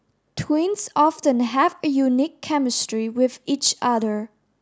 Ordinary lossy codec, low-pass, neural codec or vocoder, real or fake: none; none; none; real